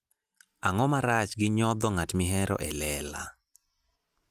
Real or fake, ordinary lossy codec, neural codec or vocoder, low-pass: real; Opus, 32 kbps; none; 14.4 kHz